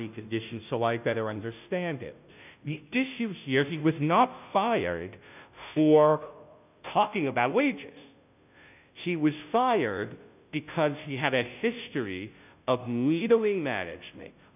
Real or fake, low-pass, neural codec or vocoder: fake; 3.6 kHz; codec, 16 kHz, 0.5 kbps, FunCodec, trained on Chinese and English, 25 frames a second